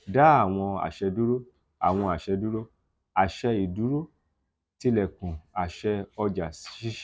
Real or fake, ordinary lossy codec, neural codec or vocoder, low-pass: real; none; none; none